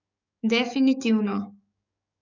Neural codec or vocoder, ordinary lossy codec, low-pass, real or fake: codec, 44.1 kHz, 7.8 kbps, DAC; none; 7.2 kHz; fake